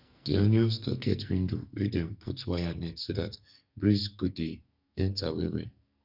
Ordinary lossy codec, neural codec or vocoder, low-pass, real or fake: none; codec, 44.1 kHz, 2.6 kbps, SNAC; 5.4 kHz; fake